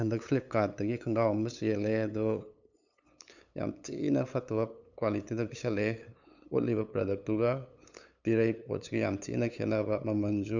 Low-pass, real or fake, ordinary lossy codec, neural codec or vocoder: 7.2 kHz; fake; none; codec, 16 kHz, 8 kbps, FunCodec, trained on LibriTTS, 25 frames a second